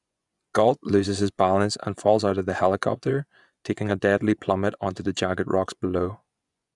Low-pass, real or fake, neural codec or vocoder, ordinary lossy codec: 10.8 kHz; fake; vocoder, 24 kHz, 100 mel bands, Vocos; none